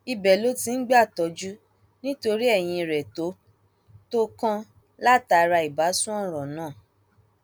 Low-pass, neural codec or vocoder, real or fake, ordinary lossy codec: none; none; real; none